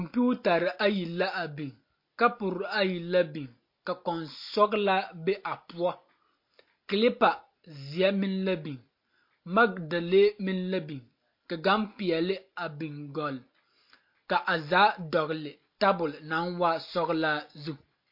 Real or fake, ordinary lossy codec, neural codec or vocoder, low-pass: real; MP3, 32 kbps; none; 5.4 kHz